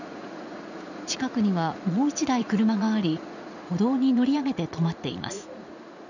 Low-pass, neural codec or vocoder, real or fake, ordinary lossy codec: 7.2 kHz; vocoder, 44.1 kHz, 80 mel bands, Vocos; fake; none